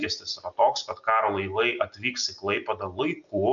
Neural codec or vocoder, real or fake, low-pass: none; real; 7.2 kHz